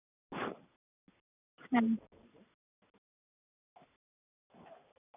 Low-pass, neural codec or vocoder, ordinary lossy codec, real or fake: 3.6 kHz; none; none; real